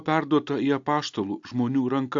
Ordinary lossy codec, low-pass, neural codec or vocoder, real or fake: AAC, 64 kbps; 7.2 kHz; none; real